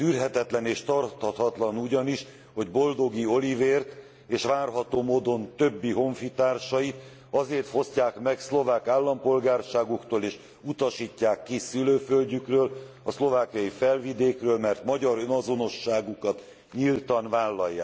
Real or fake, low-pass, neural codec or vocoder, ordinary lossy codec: real; none; none; none